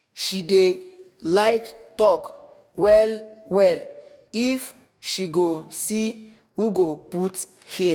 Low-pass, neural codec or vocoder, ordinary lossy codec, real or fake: 19.8 kHz; codec, 44.1 kHz, 2.6 kbps, DAC; none; fake